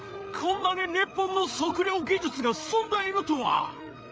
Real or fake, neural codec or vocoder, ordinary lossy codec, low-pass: fake; codec, 16 kHz, 8 kbps, FreqCodec, larger model; none; none